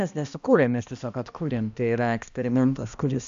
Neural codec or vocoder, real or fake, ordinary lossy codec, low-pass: codec, 16 kHz, 1 kbps, X-Codec, HuBERT features, trained on balanced general audio; fake; AAC, 96 kbps; 7.2 kHz